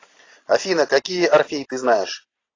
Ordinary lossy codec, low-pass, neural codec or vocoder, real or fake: AAC, 32 kbps; 7.2 kHz; none; real